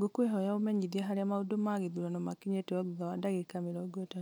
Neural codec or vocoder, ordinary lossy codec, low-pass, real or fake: none; none; none; real